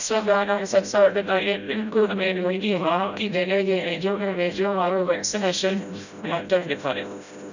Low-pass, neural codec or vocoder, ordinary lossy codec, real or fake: 7.2 kHz; codec, 16 kHz, 0.5 kbps, FreqCodec, smaller model; none; fake